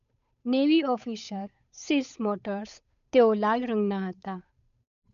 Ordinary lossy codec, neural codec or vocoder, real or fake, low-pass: none; codec, 16 kHz, 8 kbps, FunCodec, trained on Chinese and English, 25 frames a second; fake; 7.2 kHz